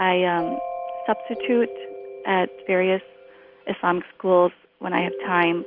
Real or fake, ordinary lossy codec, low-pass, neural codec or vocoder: real; Opus, 32 kbps; 5.4 kHz; none